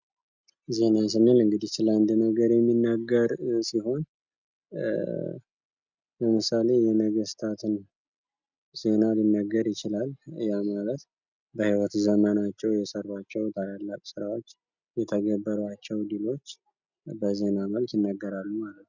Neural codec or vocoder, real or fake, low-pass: none; real; 7.2 kHz